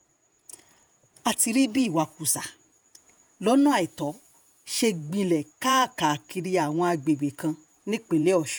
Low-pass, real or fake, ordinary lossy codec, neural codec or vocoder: none; fake; none; vocoder, 48 kHz, 128 mel bands, Vocos